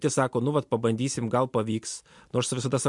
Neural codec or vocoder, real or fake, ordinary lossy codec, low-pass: none; real; MP3, 64 kbps; 10.8 kHz